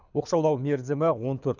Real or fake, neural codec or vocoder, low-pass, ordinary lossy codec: fake; codec, 24 kHz, 6 kbps, HILCodec; 7.2 kHz; none